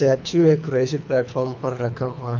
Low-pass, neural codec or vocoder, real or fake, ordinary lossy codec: 7.2 kHz; codec, 24 kHz, 3 kbps, HILCodec; fake; MP3, 64 kbps